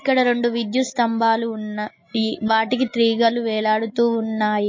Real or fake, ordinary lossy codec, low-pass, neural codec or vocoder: real; MP3, 32 kbps; 7.2 kHz; none